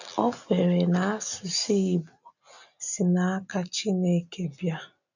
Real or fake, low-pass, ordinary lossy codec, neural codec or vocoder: real; 7.2 kHz; none; none